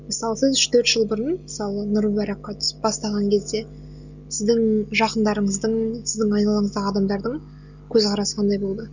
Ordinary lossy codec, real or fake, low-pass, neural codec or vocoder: none; real; 7.2 kHz; none